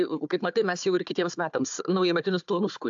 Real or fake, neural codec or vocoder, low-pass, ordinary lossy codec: fake; codec, 16 kHz, 4 kbps, FunCodec, trained on Chinese and English, 50 frames a second; 7.2 kHz; AAC, 64 kbps